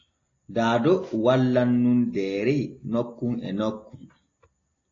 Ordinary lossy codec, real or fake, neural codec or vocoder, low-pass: AAC, 32 kbps; real; none; 7.2 kHz